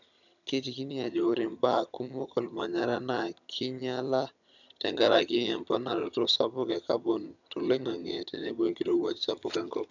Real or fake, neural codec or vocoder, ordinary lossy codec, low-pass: fake; vocoder, 22.05 kHz, 80 mel bands, HiFi-GAN; none; 7.2 kHz